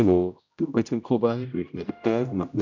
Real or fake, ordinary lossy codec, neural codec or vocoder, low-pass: fake; none; codec, 16 kHz, 0.5 kbps, X-Codec, HuBERT features, trained on general audio; 7.2 kHz